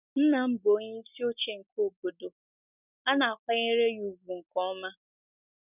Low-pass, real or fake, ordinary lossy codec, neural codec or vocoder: 3.6 kHz; real; none; none